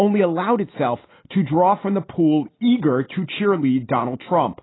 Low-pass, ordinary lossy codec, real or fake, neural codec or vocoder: 7.2 kHz; AAC, 16 kbps; real; none